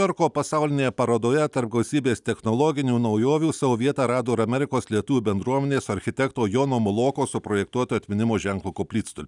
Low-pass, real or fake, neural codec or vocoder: 10.8 kHz; real; none